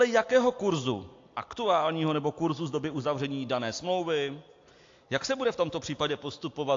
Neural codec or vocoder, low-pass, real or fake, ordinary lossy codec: none; 7.2 kHz; real; AAC, 48 kbps